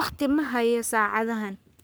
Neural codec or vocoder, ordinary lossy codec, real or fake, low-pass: codec, 44.1 kHz, 3.4 kbps, Pupu-Codec; none; fake; none